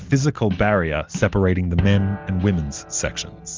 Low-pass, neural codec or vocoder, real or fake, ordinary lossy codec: 7.2 kHz; none; real; Opus, 32 kbps